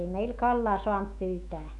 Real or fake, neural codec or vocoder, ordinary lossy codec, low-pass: fake; autoencoder, 48 kHz, 128 numbers a frame, DAC-VAE, trained on Japanese speech; none; 10.8 kHz